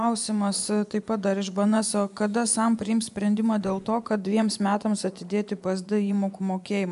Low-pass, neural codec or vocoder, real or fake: 10.8 kHz; vocoder, 24 kHz, 100 mel bands, Vocos; fake